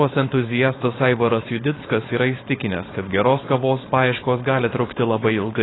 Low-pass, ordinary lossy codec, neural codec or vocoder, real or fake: 7.2 kHz; AAC, 16 kbps; codec, 16 kHz, 4.8 kbps, FACodec; fake